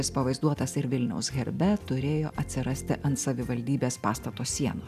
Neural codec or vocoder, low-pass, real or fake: none; 14.4 kHz; real